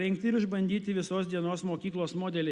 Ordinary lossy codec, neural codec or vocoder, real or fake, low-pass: Opus, 64 kbps; none; real; 10.8 kHz